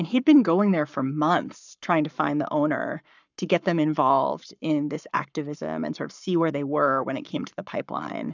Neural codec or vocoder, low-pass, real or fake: codec, 44.1 kHz, 7.8 kbps, Pupu-Codec; 7.2 kHz; fake